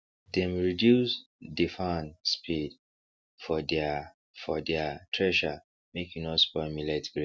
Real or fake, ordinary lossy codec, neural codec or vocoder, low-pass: real; none; none; none